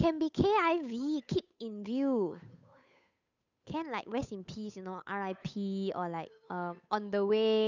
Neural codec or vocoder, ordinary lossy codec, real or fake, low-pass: codec, 16 kHz, 8 kbps, FunCodec, trained on Chinese and English, 25 frames a second; none; fake; 7.2 kHz